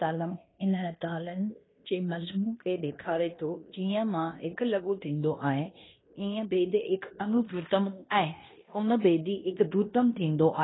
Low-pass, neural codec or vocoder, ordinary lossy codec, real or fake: 7.2 kHz; codec, 16 kHz in and 24 kHz out, 0.9 kbps, LongCat-Audio-Codec, fine tuned four codebook decoder; AAC, 16 kbps; fake